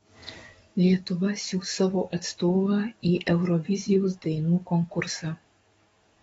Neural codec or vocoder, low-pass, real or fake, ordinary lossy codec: codec, 44.1 kHz, 7.8 kbps, DAC; 19.8 kHz; fake; AAC, 24 kbps